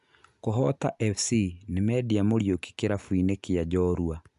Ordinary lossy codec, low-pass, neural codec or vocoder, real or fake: none; 10.8 kHz; none; real